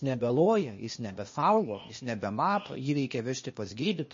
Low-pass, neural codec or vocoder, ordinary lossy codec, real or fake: 7.2 kHz; codec, 16 kHz, 0.8 kbps, ZipCodec; MP3, 32 kbps; fake